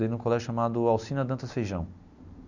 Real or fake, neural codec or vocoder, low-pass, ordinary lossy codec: real; none; 7.2 kHz; none